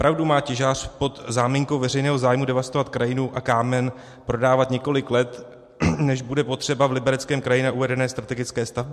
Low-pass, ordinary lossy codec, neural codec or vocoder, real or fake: 14.4 kHz; MP3, 64 kbps; none; real